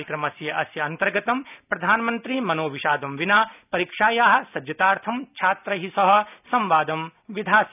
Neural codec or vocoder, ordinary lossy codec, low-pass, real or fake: none; none; 3.6 kHz; real